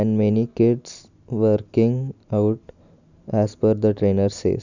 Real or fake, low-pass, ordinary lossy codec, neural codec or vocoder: real; 7.2 kHz; none; none